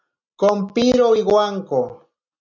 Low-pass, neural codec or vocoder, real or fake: 7.2 kHz; none; real